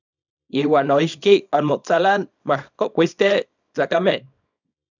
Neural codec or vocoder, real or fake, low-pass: codec, 24 kHz, 0.9 kbps, WavTokenizer, small release; fake; 7.2 kHz